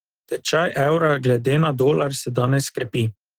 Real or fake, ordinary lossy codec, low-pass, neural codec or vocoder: real; Opus, 16 kbps; 19.8 kHz; none